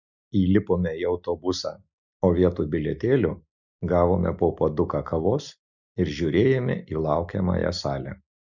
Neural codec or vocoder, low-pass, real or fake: none; 7.2 kHz; real